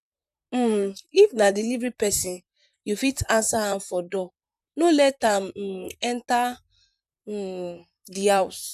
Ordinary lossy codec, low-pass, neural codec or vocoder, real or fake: none; 14.4 kHz; vocoder, 44.1 kHz, 128 mel bands, Pupu-Vocoder; fake